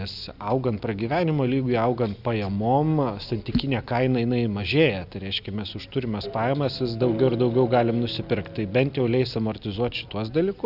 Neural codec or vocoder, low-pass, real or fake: none; 5.4 kHz; real